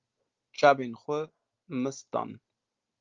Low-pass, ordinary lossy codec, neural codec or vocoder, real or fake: 7.2 kHz; Opus, 32 kbps; none; real